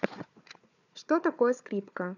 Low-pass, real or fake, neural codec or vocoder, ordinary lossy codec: 7.2 kHz; fake; codec, 16 kHz, 4 kbps, FunCodec, trained on Chinese and English, 50 frames a second; none